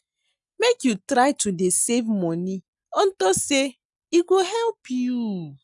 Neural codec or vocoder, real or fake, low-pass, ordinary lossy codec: none; real; 10.8 kHz; none